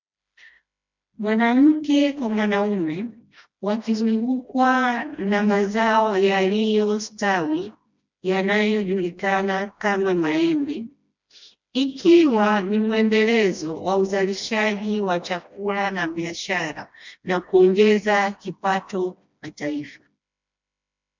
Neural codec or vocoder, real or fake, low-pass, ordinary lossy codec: codec, 16 kHz, 1 kbps, FreqCodec, smaller model; fake; 7.2 kHz; MP3, 64 kbps